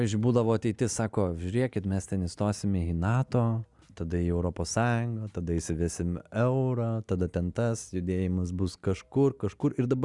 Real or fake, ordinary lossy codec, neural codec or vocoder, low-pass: real; Opus, 64 kbps; none; 10.8 kHz